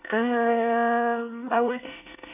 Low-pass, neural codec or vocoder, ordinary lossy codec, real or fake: 3.6 kHz; codec, 24 kHz, 1 kbps, SNAC; none; fake